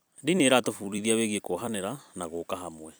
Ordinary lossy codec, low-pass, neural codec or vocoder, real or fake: none; none; vocoder, 44.1 kHz, 128 mel bands every 256 samples, BigVGAN v2; fake